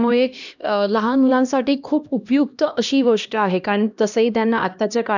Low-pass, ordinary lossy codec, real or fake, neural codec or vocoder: 7.2 kHz; none; fake; codec, 16 kHz, 1 kbps, X-Codec, HuBERT features, trained on LibriSpeech